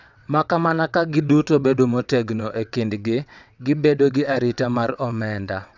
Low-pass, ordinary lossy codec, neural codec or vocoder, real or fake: 7.2 kHz; none; vocoder, 22.05 kHz, 80 mel bands, WaveNeXt; fake